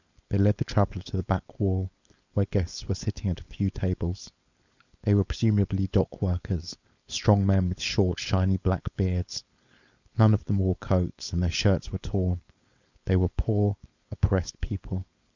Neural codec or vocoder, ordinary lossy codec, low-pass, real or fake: codec, 16 kHz, 4.8 kbps, FACodec; Opus, 64 kbps; 7.2 kHz; fake